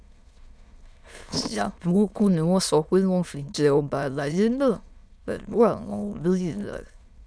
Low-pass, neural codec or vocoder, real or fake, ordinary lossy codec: none; autoencoder, 22.05 kHz, a latent of 192 numbers a frame, VITS, trained on many speakers; fake; none